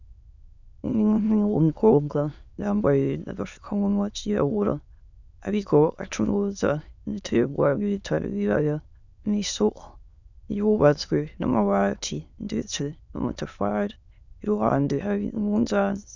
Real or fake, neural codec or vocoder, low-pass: fake; autoencoder, 22.05 kHz, a latent of 192 numbers a frame, VITS, trained on many speakers; 7.2 kHz